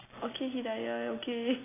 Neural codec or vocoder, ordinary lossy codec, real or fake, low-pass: none; AAC, 16 kbps; real; 3.6 kHz